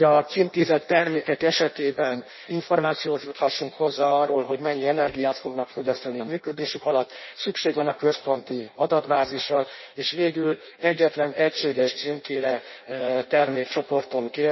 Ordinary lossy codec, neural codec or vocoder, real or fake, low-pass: MP3, 24 kbps; codec, 16 kHz in and 24 kHz out, 0.6 kbps, FireRedTTS-2 codec; fake; 7.2 kHz